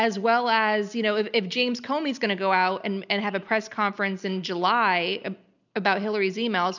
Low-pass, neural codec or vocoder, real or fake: 7.2 kHz; none; real